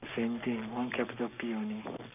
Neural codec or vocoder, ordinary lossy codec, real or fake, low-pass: codec, 44.1 kHz, 7.8 kbps, Pupu-Codec; none; fake; 3.6 kHz